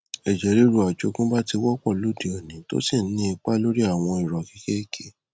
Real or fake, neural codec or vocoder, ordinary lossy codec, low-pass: real; none; none; none